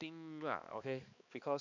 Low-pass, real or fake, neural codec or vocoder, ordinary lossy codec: 7.2 kHz; fake; codec, 16 kHz, 2 kbps, X-Codec, HuBERT features, trained on balanced general audio; none